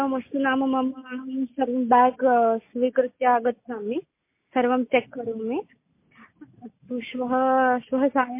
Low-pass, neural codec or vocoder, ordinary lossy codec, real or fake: 3.6 kHz; none; MP3, 24 kbps; real